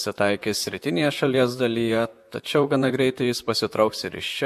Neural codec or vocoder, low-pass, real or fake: vocoder, 44.1 kHz, 128 mel bands, Pupu-Vocoder; 14.4 kHz; fake